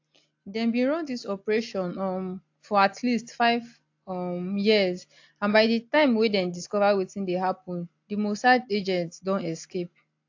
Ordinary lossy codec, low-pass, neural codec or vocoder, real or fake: AAC, 48 kbps; 7.2 kHz; none; real